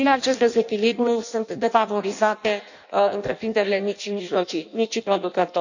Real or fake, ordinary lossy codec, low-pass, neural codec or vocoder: fake; none; 7.2 kHz; codec, 16 kHz in and 24 kHz out, 0.6 kbps, FireRedTTS-2 codec